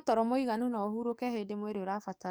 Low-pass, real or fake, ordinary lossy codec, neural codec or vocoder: none; fake; none; codec, 44.1 kHz, 7.8 kbps, DAC